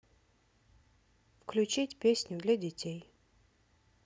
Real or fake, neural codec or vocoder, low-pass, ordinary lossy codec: real; none; none; none